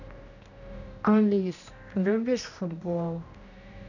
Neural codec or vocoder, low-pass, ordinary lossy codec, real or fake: codec, 16 kHz, 1 kbps, X-Codec, HuBERT features, trained on general audio; 7.2 kHz; none; fake